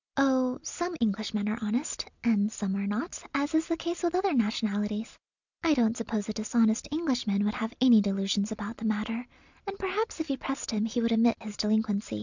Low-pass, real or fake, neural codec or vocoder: 7.2 kHz; real; none